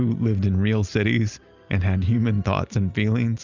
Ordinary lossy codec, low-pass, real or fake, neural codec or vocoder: Opus, 64 kbps; 7.2 kHz; real; none